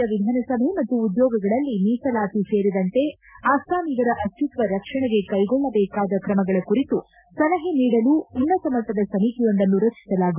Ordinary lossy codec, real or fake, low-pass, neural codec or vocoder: none; real; 3.6 kHz; none